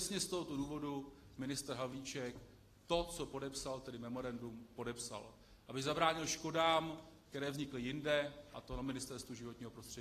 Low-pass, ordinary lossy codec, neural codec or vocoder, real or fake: 14.4 kHz; AAC, 48 kbps; none; real